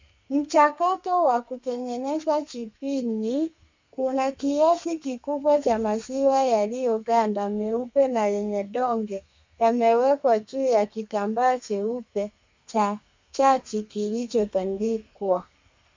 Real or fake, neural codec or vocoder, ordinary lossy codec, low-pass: fake; codec, 32 kHz, 1.9 kbps, SNAC; MP3, 64 kbps; 7.2 kHz